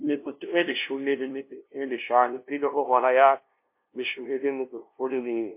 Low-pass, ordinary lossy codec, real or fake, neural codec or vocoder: 3.6 kHz; MP3, 24 kbps; fake; codec, 16 kHz, 0.5 kbps, FunCodec, trained on LibriTTS, 25 frames a second